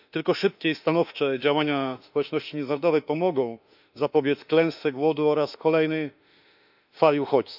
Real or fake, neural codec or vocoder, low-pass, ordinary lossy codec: fake; autoencoder, 48 kHz, 32 numbers a frame, DAC-VAE, trained on Japanese speech; 5.4 kHz; none